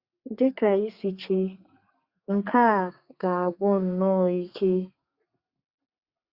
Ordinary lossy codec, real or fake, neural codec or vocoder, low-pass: Opus, 64 kbps; fake; codec, 32 kHz, 1.9 kbps, SNAC; 5.4 kHz